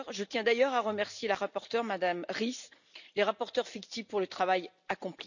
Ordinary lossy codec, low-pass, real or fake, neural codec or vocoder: none; 7.2 kHz; real; none